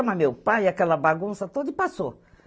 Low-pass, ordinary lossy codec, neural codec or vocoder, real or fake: none; none; none; real